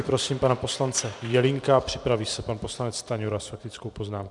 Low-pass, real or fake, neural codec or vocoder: 10.8 kHz; fake; vocoder, 44.1 kHz, 128 mel bands every 512 samples, BigVGAN v2